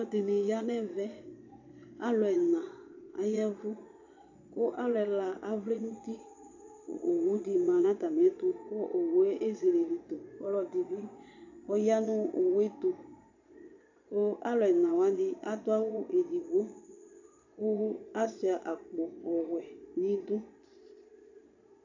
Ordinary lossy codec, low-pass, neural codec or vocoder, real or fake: AAC, 48 kbps; 7.2 kHz; vocoder, 22.05 kHz, 80 mel bands, Vocos; fake